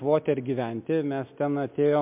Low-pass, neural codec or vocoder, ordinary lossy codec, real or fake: 3.6 kHz; none; MP3, 32 kbps; real